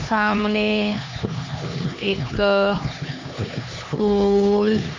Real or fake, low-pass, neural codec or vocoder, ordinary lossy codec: fake; 7.2 kHz; codec, 16 kHz, 2 kbps, X-Codec, HuBERT features, trained on LibriSpeech; MP3, 48 kbps